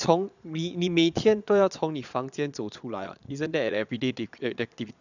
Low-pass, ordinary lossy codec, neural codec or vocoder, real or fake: 7.2 kHz; none; vocoder, 22.05 kHz, 80 mel bands, Vocos; fake